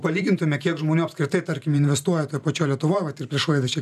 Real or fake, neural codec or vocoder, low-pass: fake; vocoder, 44.1 kHz, 128 mel bands every 512 samples, BigVGAN v2; 14.4 kHz